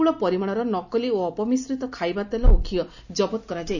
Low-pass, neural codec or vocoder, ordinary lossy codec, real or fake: 7.2 kHz; none; none; real